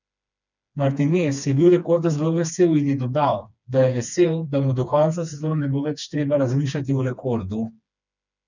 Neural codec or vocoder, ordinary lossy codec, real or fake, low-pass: codec, 16 kHz, 2 kbps, FreqCodec, smaller model; none; fake; 7.2 kHz